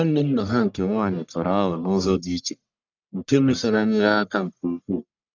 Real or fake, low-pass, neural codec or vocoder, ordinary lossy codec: fake; 7.2 kHz; codec, 44.1 kHz, 1.7 kbps, Pupu-Codec; none